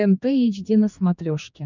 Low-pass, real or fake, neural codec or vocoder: 7.2 kHz; fake; codec, 16 kHz, 2 kbps, X-Codec, HuBERT features, trained on general audio